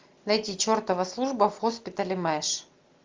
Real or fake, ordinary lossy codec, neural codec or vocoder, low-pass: real; Opus, 32 kbps; none; 7.2 kHz